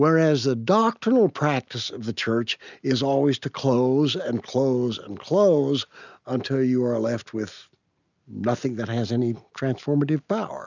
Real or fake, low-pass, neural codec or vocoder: real; 7.2 kHz; none